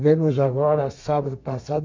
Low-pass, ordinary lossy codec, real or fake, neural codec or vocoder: 7.2 kHz; MP3, 48 kbps; fake; codec, 32 kHz, 1.9 kbps, SNAC